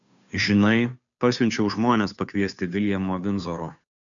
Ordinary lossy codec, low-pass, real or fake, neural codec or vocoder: MP3, 96 kbps; 7.2 kHz; fake; codec, 16 kHz, 2 kbps, FunCodec, trained on Chinese and English, 25 frames a second